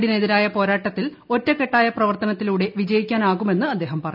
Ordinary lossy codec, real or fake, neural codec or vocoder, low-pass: none; real; none; 5.4 kHz